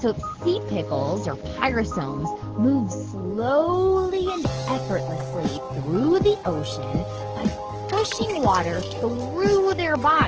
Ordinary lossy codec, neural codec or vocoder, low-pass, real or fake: Opus, 16 kbps; codec, 44.1 kHz, 7.8 kbps, DAC; 7.2 kHz; fake